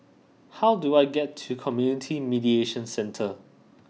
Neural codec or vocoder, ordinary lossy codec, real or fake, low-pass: none; none; real; none